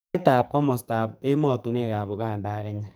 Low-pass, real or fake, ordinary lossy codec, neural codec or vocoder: none; fake; none; codec, 44.1 kHz, 2.6 kbps, SNAC